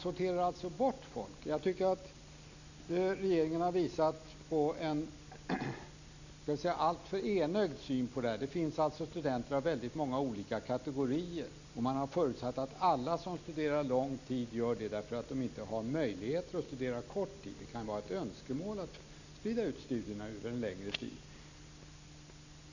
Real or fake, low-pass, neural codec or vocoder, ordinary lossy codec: real; 7.2 kHz; none; none